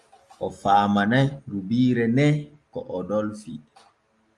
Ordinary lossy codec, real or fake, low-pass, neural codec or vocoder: Opus, 32 kbps; real; 10.8 kHz; none